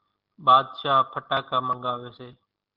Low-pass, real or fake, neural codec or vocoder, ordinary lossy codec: 5.4 kHz; real; none; Opus, 16 kbps